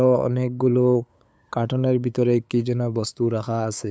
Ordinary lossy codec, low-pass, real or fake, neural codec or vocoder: none; none; fake; codec, 16 kHz, 8 kbps, FunCodec, trained on LibriTTS, 25 frames a second